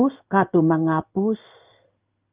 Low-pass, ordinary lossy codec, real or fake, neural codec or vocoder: 3.6 kHz; Opus, 24 kbps; fake; codec, 16 kHz in and 24 kHz out, 1 kbps, XY-Tokenizer